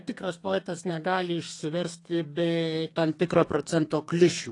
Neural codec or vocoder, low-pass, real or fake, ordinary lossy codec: codec, 32 kHz, 1.9 kbps, SNAC; 10.8 kHz; fake; AAC, 48 kbps